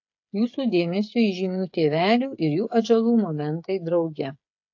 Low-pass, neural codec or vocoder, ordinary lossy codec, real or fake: 7.2 kHz; codec, 16 kHz, 8 kbps, FreqCodec, smaller model; AAC, 48 kbps; fake